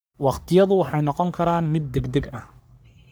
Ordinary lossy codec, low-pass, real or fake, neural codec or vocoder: none; none; fake; codec, 44.1 kHz, 3.4 kbps, Pupu-Codec